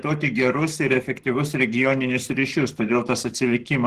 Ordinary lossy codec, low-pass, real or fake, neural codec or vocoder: Opus, 16 kbps; 14.4 kHz; fake; codec, 44.1 kHz, 7.8 kbps, Pupu-Codec